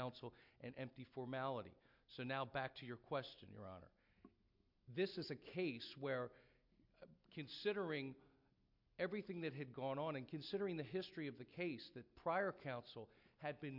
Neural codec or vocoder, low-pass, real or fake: none; 5.4 kHz; real